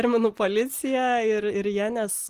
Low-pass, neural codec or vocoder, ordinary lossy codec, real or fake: 14.4 kHz; none; Opus, 24 kbps; real